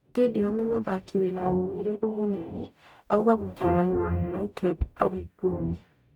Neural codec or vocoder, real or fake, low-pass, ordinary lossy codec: codec, 44.1 kHz, 0.9 kbps, DAC; fake; 19.8 kHz; none